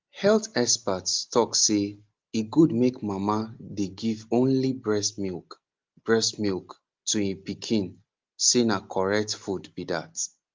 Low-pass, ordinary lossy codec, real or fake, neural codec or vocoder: 7.2 kHz; Opus, 24 kbps; real; none